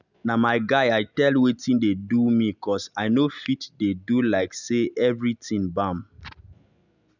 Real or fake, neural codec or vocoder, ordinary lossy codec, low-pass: real; none; none; 7.2 kHz